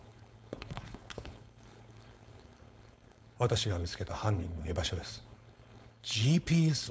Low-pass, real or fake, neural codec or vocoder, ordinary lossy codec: none; fake; codec, 16 kHz, 4.8 kbps, FACodec; none